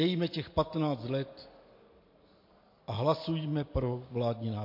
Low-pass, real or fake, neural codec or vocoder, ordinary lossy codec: 5.4 kHz; real; none; MP3, 32 kbps